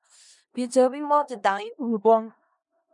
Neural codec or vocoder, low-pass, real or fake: codec, 16 kHz in and 24 kHz out, 0.4 kbps, LongCat-Audio-Codec, four codebook decoder; 10.8 kHz; fake